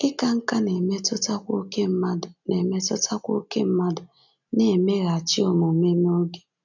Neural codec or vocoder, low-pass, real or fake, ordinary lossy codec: none; 7.2 kHz; real; none